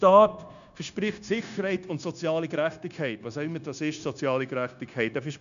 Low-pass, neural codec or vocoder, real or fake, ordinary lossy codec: 7.2 kHz; codec, 16 kHz, 0.9 kbps, LongCat-Audio-Codec; fake; none